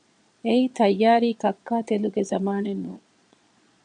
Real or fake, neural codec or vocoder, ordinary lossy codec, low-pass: fake; vocoder, 22.05 kHz, 80 mel bands, Vocos; MP3, 96 kbps; 9.9 kHz